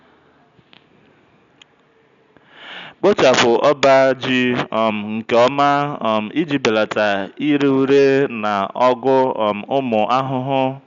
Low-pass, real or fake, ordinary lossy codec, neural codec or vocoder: 7.2 kHz; real; none; none